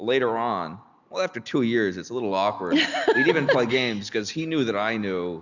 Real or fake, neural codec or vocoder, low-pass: real; none; 7.2 kHz